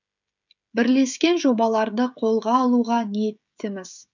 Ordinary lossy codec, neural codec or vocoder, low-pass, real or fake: none; codec, 16 kHz, 16 kbps, FreqCodec, smaller model; 7.2 kHz; fake